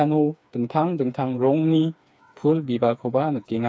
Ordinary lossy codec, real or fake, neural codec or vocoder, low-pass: none; fake; codec, 16 kHz, 4 kbps, FreqCodec, smaller model; none